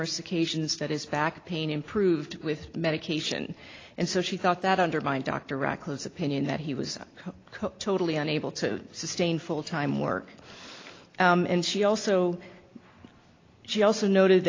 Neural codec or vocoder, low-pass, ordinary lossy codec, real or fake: none; 7.2 kHz; AAC, 32 kbps; real